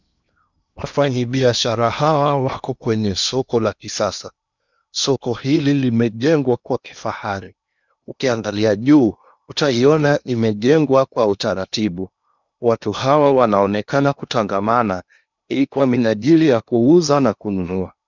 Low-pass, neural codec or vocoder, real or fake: 7.2 kHz; codec, 16 kHz in and 24 kHz out, 0.8 kbps, FocalCodec, streaming, 65536 codes; fake